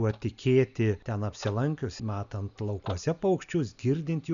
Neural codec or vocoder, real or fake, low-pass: none; real; 7.2 kHz